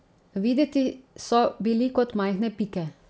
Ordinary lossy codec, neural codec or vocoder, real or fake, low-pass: none; none; real; none